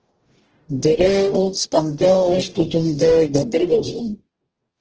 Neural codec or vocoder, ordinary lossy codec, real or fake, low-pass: codec, 44.1 kHz, 0.9 kbps, DAC; Opus, 16 kbps; fake; 7.2 kHz